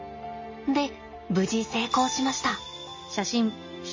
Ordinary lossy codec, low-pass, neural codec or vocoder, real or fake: MP3, 32 kbps; 7.2 kHz; none; real